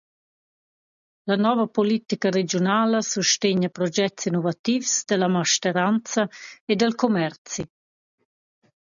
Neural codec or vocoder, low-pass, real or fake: none; 7.2 kHz; real